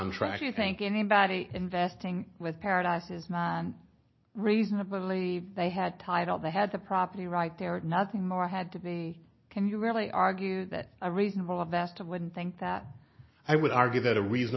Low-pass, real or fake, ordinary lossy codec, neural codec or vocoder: 7.2 kHz; real; MP3, 24 kbps; none